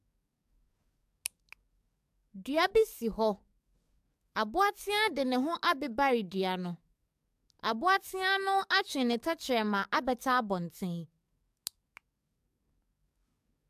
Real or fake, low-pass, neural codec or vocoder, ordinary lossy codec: fake; 14.4 kHz; codec, 44.1 kHz, 7.8 kbps, DAC; AAC, 96 kbps